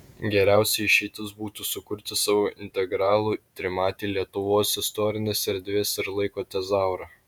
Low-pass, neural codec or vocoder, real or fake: 19.8 kHz; vocoder, 48 kHz, 128 mel bands, Vocos; fake